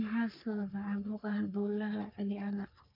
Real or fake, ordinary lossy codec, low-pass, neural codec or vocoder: fake; none; 5.4 kHz; codec, 44.1 kHz, 3.4 kbps, Pupu-Codec